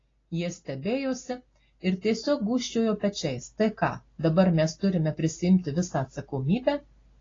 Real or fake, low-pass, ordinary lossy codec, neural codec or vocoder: real; 7.2 kHz; AAC, 32 kbps; none